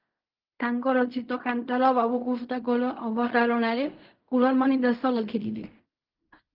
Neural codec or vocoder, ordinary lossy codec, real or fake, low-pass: codec, 16 kHz in and 24 kHz out, 0.4 kbps, LongCat-Audio-Codec, fine tuned four codebook decoder; Opus, 32 kbps; fake; 5.4 kHz